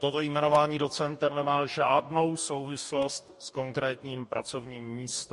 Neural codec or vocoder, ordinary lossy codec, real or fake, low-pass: codec, 44.1 kHz, 2.6 kbps, DAC; MP3, 48 kbps; fake; 14.4 kHz